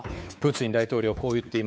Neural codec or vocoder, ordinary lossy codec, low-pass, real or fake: codec, 16 kHz, 4 kbps, X-Codec, WavLM features, trained on Multilingual LibriSpeech; none; none; fake